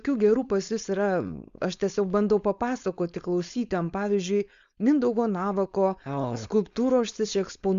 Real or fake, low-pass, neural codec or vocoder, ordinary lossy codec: fake; 7.2 kHz; codec, 16 kHz, 4.8 kbps, FACodec; Opus, 64 kbps